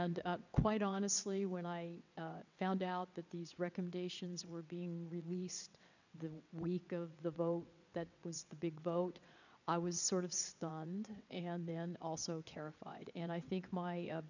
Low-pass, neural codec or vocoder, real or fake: 7.2 kHz; vocoder, 22.05 kHz, 80 mel bands, WaveNeXt; fake